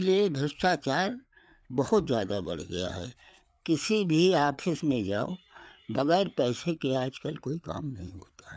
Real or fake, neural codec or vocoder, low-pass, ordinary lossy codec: fake; codec, 16 kHz, 4 kbps, FreqCodec, larger model; none; none